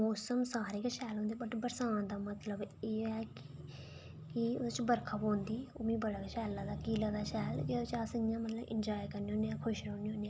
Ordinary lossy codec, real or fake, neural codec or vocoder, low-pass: none; real; none; none